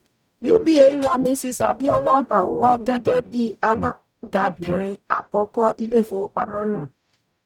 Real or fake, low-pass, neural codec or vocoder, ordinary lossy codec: fake; 19.8 kHz; codec, 44.1 kHz, 0.9 kbps, DAC; none